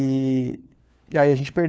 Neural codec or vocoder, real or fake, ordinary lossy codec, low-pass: codec, 16 kHz, 4 kbps, FunCodec, trained on LibriTTS, 50 frames a second; fake; none; none